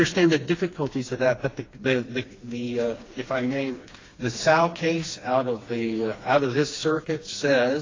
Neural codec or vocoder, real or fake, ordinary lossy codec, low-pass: codec, 16 kHz, 2 kbps, FreqCodec, smaller model; fake; AAC, 32 kbps; 7.2 kHz